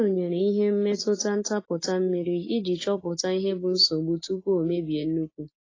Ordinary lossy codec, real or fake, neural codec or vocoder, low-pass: AAC, 32 kbps; real; none; 7.2 kHz